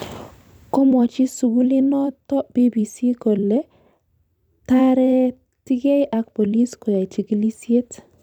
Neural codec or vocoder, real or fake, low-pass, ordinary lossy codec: vocoder, 44.1 kHz, 128 mel bands every 256 samples, BigVGAN v2; fake; 19.8 kHz; none